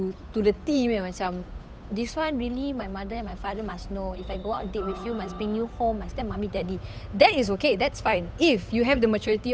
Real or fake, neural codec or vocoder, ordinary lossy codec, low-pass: fake; codec, 16 kHz, 8 kbps, FunCodec, trained on Chinese and English, 25 frames a second; none; none